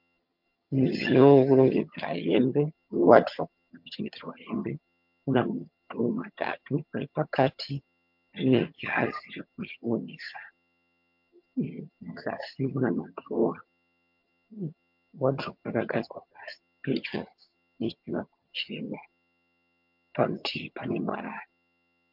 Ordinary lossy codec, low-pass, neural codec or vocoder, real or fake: MP3, 48 kbps; 5.4 kHz; vocoder, 22.05 kHz, 80 mel bands, HiFi-GAN; fake